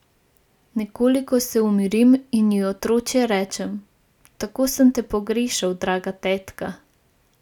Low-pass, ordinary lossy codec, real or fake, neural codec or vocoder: 19.8 kHz; none; real; none